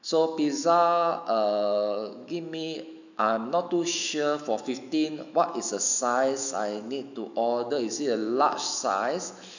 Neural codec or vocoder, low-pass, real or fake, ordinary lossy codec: autoencoder, 48 kHz, 128 numbers a frame, DAC-VAE, trained on Japanese speech; 7.2 kHz; fake; none